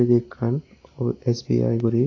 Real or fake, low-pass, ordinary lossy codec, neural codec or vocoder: real; 7.2 kHz; AAC, 32 kbps; none